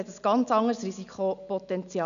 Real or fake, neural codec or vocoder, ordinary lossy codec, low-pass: real; none; none; 7.2 kHz